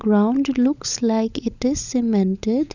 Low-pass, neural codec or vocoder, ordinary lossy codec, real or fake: 7.2 kHz; codec, 16 kHz, 16 kbps, FunCodec, trained on LibriTTS, 50 frames a second; none; fake